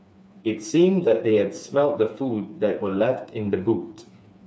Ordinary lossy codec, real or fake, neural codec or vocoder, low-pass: none; fake; codec, 16 kHz, 4 kbps, FreqCodec, smaller model; none